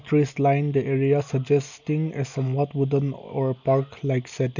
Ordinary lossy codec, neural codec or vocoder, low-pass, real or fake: none; none; 7.2 kHz; real